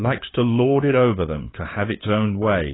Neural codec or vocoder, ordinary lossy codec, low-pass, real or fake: codec, 16 kHz, 4 kbps, FunCodec, trained on LibriTTS, 50 frames a second; AAC, 16 kbps; 7.2 kHz; fake